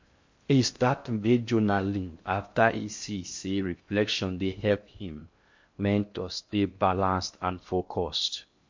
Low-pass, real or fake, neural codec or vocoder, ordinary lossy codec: 7.2 kHz; fake; codec, 16 kHz in and 24 kHz out, 0.6 kbps, FocalCodec, streaming, 2048 codes; MP3, 48 kbps